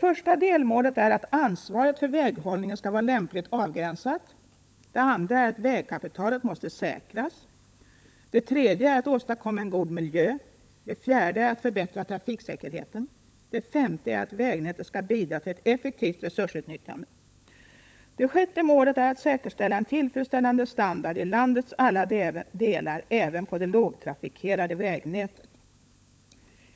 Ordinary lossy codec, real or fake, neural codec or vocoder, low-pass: none; fake; codec, 16 kHz, 16 kbps, FunCodec, trained on LibriTTS, 50 frames a second; none